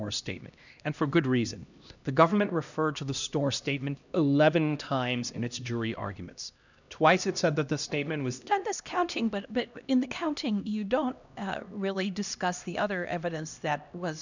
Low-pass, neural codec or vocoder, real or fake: 7.2 kHz; codec, 16 kHz, 1 kbps, X-Codec, HuBERT features, trained on LibriSpeech; fake